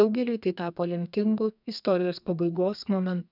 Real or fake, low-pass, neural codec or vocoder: fake; 5.4 kHz; codec, 32 kHz, 1.9 kbps, SNAC